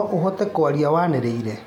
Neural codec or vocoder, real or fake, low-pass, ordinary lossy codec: none; real; 19.8 kHz; MP3, 96 kbps